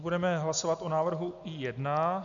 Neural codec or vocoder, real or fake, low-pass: none; real; 7.2 kHz